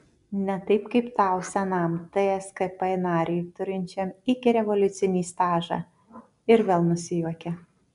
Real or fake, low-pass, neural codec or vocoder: real; 10.8 kHz; none